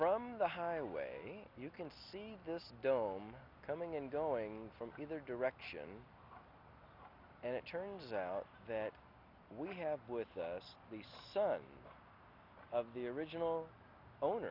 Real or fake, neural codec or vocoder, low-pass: real; none; 5.4 kHz